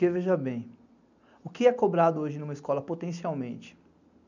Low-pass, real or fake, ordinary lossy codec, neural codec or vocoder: 7.2 kHz; real; none; none